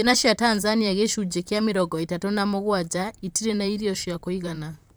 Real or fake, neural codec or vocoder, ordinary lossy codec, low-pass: fake; vocoder, 44.1 kHz, 128 mel bands, Pupu-Vocoder; none; none